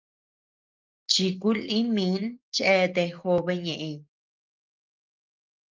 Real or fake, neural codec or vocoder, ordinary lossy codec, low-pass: real; none; Opus, 24 kbps; 7.2 kHz